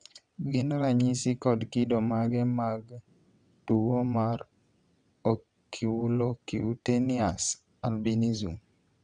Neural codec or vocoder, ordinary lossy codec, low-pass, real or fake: vocoder, 22.05 kHz, 80 mel bands, WaveNeXt; none; 9.9 kHz; fake